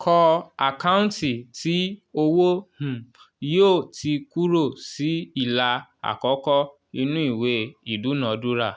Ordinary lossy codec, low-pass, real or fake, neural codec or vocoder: none; none; real; none